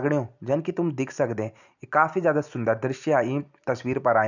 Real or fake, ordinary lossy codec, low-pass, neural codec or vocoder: real; none; 7.2 kHz; none